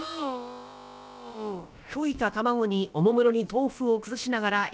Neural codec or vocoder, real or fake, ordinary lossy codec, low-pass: codec, 16 kHz, about 1 kbps, DyCAST, with the encoder's durations; fake; none; none